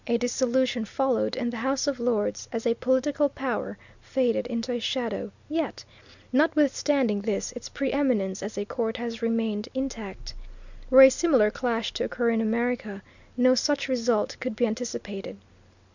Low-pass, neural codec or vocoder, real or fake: 7.2 kHz; none; real